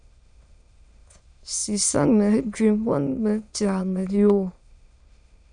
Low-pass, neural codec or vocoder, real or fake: 9.9 kHz; autoencoder, 22.05 kHz, a latent of 192 numbers a frame, VITS, trained on many speakers; fake